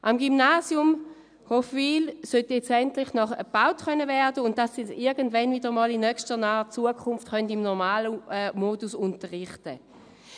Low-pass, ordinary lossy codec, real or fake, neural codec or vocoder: 9.9 kHz; none; real; none